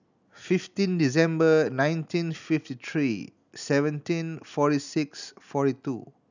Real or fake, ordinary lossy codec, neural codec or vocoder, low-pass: real; none; none; 7.2 kHz